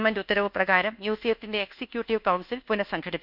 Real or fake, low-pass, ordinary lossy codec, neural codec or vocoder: fake; 5.4 kHz; none; codec, 24 kHz, 1.2 kbps, DualCodec